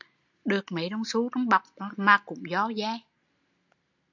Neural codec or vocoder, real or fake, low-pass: none; real; 7.2 kHz